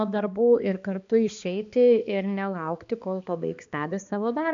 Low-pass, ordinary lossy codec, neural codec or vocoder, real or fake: 7.2 kHz; MP3, 64 kbps; codec, 16 kHz, 2 kbps, X-Codec, HuBERT features, trained on balanced general audio; fake